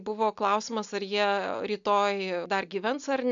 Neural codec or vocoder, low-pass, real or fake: none; 7.2 kHz; real